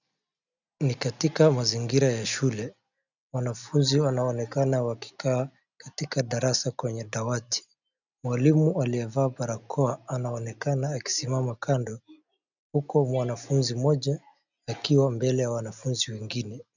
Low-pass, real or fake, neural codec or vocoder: 7.2 kHz; real; none